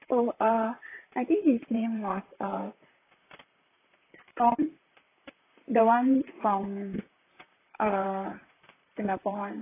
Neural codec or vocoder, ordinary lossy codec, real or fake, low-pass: vocoder, 44.1 kHz, 128 mel bands, Pupu-Vocoder; AAC, 24 kbps; fake; 3.6 kHz